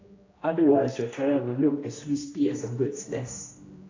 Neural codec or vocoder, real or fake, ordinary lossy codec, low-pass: codec, 16 kHz, 1 kbps, X-Codec, HuBERT features, trained on general audio; fake; AAC, 32 kbps; 7.2 kHz